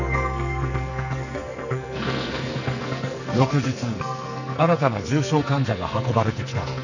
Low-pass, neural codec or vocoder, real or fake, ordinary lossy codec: 7.2 kHz; codec, 44.1 kHz, 2.6 kbps, SNAC; fake; none